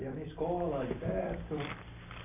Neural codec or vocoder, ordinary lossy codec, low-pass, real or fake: vocoder, 44.1 kHz, 128 mel bands every 256 samples, BigVGAN v2; AAC, 24 kbps; 3.6 kHz; fake